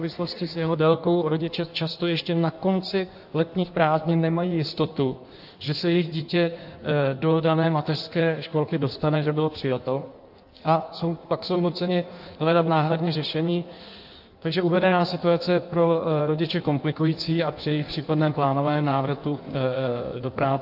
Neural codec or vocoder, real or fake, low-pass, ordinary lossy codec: codec, 16 kHz in and 24 kHz out, 1.1 kbps, FireRedTTS-2 codec; fake; 5.4 kHz; AAC, 48 kbps